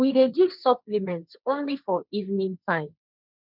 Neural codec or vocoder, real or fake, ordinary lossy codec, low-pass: codec, 16 kHz, 1.1 kbps, Voila-Tokenizer; fake; none; 5.4 kHz